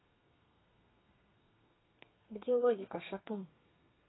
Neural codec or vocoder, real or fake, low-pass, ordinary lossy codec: codec, 32 kHz, 1.9 kbps, SNAC; fake; 7.2 kHz; AAC, 16 kbps